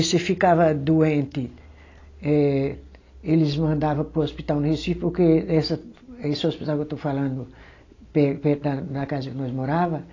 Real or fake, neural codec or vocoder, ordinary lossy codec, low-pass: real; none; AAC, 32 kbps; 7.2 kHz